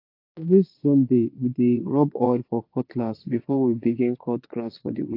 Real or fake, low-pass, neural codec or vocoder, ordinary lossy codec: fake; 5.4 kHz; vocoder, 22.05 kHz, 80 mel bands, Vocos; AAC, 32 kbps